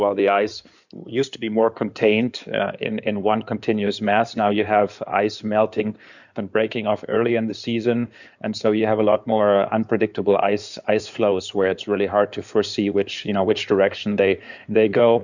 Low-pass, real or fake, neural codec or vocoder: 7.2 kHz; fake; codec, 16 kHz in and 24 kHz out, 2.2 kbps, FireRedTTS-2 codec